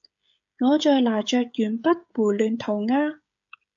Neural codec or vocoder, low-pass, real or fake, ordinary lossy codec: codec, 16 kHz, 16 kbps, FreqCodec, smaller model; 7.2 kHz; fake; AAC, 64 kbps